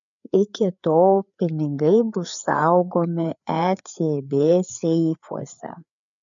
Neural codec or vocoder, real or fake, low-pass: codec, 16 kHz, 4 kbps, FreqCodec, larger model; fake; 7.2 kHz